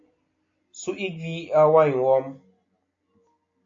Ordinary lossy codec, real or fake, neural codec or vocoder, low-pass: AAC, 48 kbps; real; none; 7.2 kHz